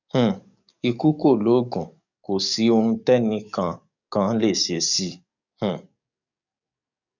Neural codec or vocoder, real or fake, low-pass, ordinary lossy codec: codec, 44.1 kHz, 7.8 kbps, DAC; fake; 7.2 kHz; none